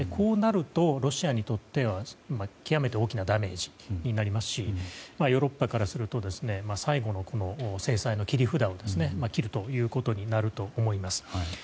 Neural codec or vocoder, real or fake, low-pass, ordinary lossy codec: none; real; none; none